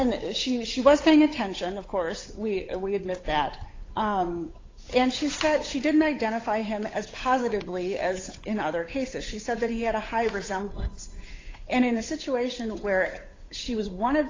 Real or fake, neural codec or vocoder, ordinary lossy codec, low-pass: fake; codec, 16 kHz, 8 kbps, FunCodec, trained on Chinese and English, 25 frames a second; AAC, 32 kbps; 7.2 kHz